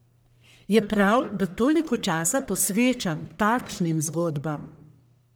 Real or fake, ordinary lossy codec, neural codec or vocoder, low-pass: fake; none; codec, 44.1 kHz, 1.7 kbps, Pupu-Codec; none